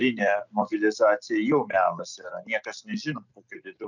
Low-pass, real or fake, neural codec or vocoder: 7.2 kHz; real; none